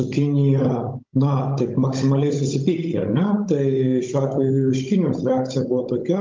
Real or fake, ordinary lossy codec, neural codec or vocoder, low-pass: fake; Opus, 24 kbps; codec, 16 kHz, 16 kbps, FunCodec, trained on Chinese and English, 50 frames a second; 7.2 kHz